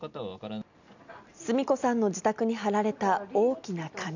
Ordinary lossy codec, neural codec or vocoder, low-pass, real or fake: none; none; 7.2 kHz; real